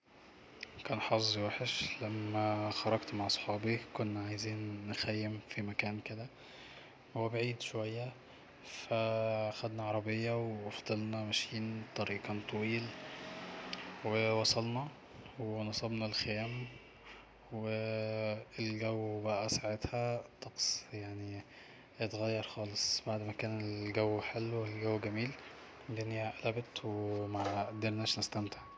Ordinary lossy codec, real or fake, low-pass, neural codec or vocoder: none; real; none; none